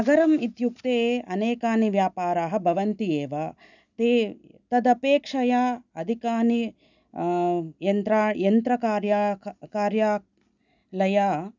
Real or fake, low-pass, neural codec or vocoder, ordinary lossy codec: real; 7.2 kHz; none; none